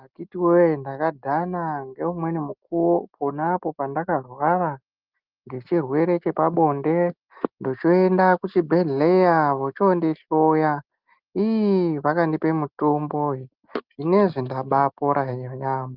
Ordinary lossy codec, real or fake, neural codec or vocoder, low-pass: Opus, 24 kbps; real; none; 5.4 kHz